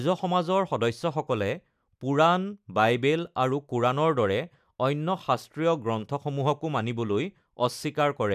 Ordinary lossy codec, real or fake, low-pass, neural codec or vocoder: none; real; 14.4 kHz; none